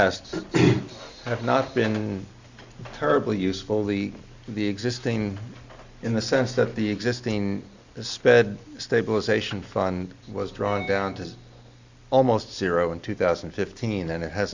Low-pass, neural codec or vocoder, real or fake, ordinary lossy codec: 7.2 kHz; none; real; Opus, 64 kbps